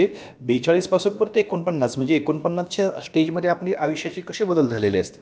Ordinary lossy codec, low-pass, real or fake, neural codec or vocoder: none; none; fake; codec, 16 kHz, about 1 kbps, DyCAST, with the encoder's durations